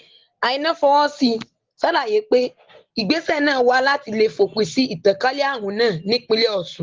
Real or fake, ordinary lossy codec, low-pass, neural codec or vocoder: real; Opus, 16 kbps; 7.2 kHz; none